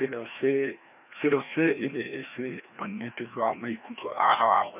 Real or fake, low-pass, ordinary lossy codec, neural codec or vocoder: fake; 3.6 kHz; none; codec, 16 kHz, 1 kbps, FreqCodec, larger model